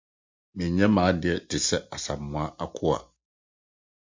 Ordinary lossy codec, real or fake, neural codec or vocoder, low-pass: MP3, 64 kbps; real; none; 7.2 kHz